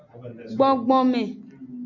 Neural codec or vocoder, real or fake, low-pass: none; real; 7.2 kHz